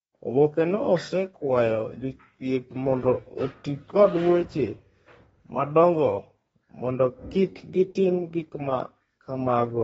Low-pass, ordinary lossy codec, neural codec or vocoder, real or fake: 14.4 kHz; AAC, 24 kbps; codec, 32 kHz, 1.9 kbps, SNAC; fake